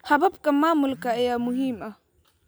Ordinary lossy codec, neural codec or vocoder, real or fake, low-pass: none; none; real; none